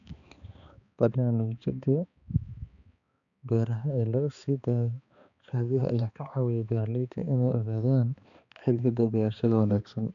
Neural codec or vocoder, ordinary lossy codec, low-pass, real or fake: codec, 16 kHz, 2 kbps, X-Codec, HuBERT features, trained on balanced general audio; none; 7.2 kHz; fake